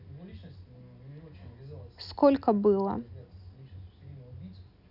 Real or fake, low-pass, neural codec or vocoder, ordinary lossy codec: real; 5.4 kHz; none; none